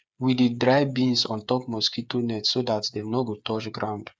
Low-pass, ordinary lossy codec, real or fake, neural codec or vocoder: none; none; fake; codec, 16 kHz, 8 kbps, FreqCodec, smaller model